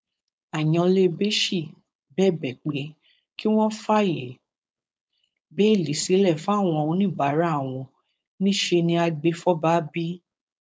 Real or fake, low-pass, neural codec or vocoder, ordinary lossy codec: fake; none; codec, 16 kHz, 4.8 kbps, FACodec; none